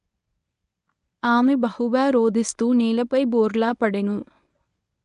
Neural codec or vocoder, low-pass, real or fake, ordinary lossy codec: codec, 24 kHz, 0.9 kbps, WavTokenizer, medium speech release version 1; 10.8 kHz; fake; none